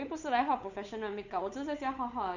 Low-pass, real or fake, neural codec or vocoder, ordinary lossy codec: 7.2 kHz; fake; codec, 16 kHz, 8 kbps, FunCodec, trained on Chinese and English, 25 frames a second; none